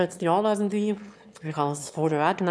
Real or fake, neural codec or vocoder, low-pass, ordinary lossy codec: fake; autoencoder, 22.05 kHz, a latent of 192 numbers a frame, VITS, trained on one speaker; none; none